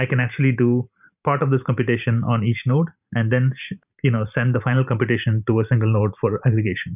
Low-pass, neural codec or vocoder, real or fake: 3.6 kHz; vocoder, 44.1 kHz, 128 mel bands every 512 samples, BigVGAN v2; fake